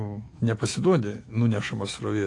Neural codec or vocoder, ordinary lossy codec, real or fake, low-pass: autoencoder, 48 kHz, 128 numbers a frame, DAC-VAE, trained on Japanese speech; AAC, 48 kbps; fake; 10.8 kHz